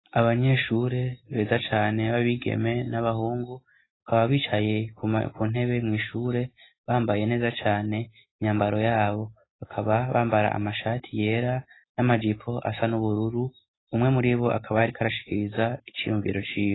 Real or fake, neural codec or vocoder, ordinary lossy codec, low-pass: real; none; AAC, 16 kbps; 7.2 kHz